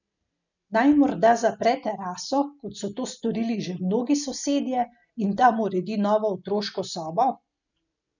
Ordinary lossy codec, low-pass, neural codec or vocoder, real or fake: none; 7.2 kHz; none; real